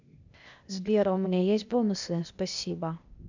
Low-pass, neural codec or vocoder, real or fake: 7.2 kHz; codec, 16 kHz, 0.8 kbps, ZipCodec; fake